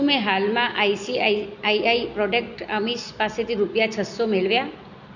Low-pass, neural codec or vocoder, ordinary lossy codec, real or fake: 7.2 kHz; none; none; real